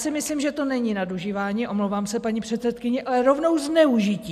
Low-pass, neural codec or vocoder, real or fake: 14.4 kHz; none; real